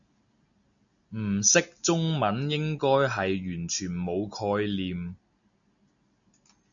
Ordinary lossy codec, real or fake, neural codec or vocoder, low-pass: MP3, 96 kbps; real; none; 7.2 kHz